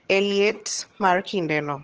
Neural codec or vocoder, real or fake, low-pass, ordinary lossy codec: vocoder, 22.05 kHz, 80 mel bands, HiFi-GAN; fake; 7.2 kHz; Opus, 16 kbps